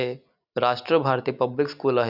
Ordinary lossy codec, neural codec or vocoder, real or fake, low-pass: none; none; real; 5.4 kHz